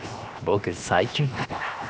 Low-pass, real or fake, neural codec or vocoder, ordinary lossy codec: none; fake; codec, 16 kHz, 0.7 kbps, FocalCodec; none